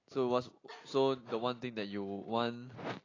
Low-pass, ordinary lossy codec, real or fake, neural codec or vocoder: 7.2 kHz; AAC, 32 kbps; real; none